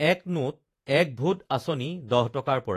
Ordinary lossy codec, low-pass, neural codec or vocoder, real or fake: AAC, 48 kbps; 14.4 kHz; vocoder, 48 kHz, 128 mel bands, Vocos; fake